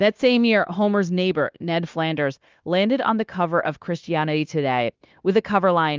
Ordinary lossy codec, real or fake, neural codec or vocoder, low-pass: Opus, 32 kbps; fake; codec, 16 kHz, 0.9 kbps, LongCat-Audio-Codec; 7.2 kHz